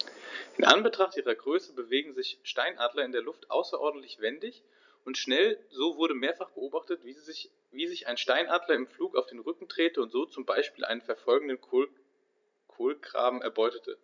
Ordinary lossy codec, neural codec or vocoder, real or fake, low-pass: none; none; real; 7.2 kHz